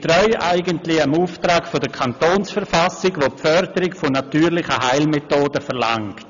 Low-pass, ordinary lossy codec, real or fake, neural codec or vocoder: 7.2 kHz; none; real; none